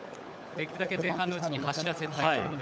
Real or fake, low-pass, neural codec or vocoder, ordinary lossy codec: fake; none; codec, 16 kHz, 16 kbps, FunCodec, trained on LibriTTS, 50 frames a second; none